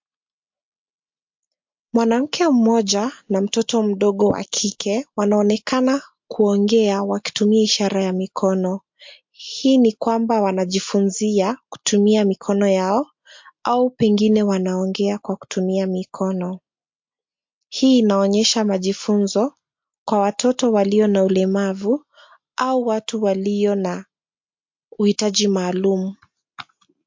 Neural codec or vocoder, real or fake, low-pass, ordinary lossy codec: none; real; 7.2 kHz; MP3, 48 kbps